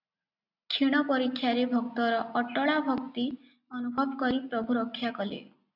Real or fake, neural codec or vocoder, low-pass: real; none; 5.4 kHz